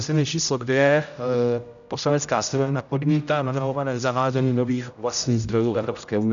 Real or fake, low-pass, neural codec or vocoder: fake; 7.2 kHz; codec, 16 kHz, 0.5 kbps, X-Codec, HuBERT features, trained on general audio